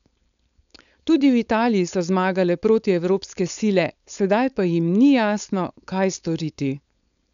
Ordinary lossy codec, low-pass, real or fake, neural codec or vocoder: none; 7.2 kHz; fake; codec, 16 kHz, 4.8 kbps, FACodec